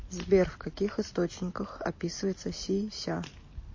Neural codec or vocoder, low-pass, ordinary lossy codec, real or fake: none; 7.2 kHz; MP3, 32 kbps; real